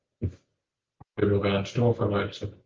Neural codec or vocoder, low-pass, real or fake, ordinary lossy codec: none; 7.2 kHz; real; Opus, 24 kbps